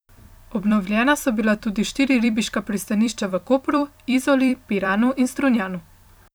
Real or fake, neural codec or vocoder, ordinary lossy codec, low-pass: fake; vocoder, 44.1 kHz, 128 mel bands every 256 samples, BigVGAN v2; none; none